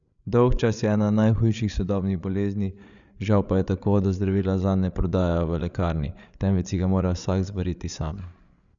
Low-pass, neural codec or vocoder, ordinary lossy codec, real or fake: 7.2 kHz; codec, 16 kHz, 8 kbps, FreqCodec, larger model; none; fake